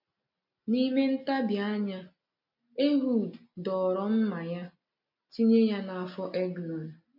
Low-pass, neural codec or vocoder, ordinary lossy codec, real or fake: 5.4 kHz; none; none; real